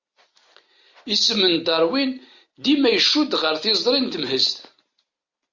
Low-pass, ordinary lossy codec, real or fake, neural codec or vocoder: 7.2 kHz; Opus, 64 kbps; real; none